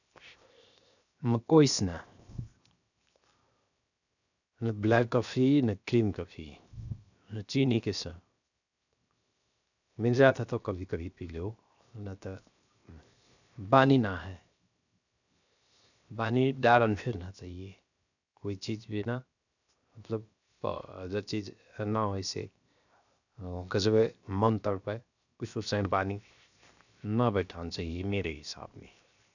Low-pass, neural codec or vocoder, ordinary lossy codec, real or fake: 7.2 kHz; codec, 16 kHz, 0.7 kbps, FocalCodec; none; fake